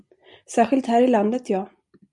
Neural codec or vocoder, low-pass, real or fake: none; 10.8 kHz; real